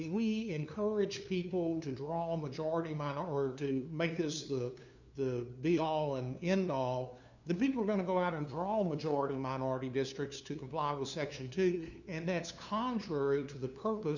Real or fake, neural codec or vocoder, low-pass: fake; codec, 16 kHz, 2 kbps, FunCodec, trained on LibriTTS, 25 frames a second; 7.2 kHz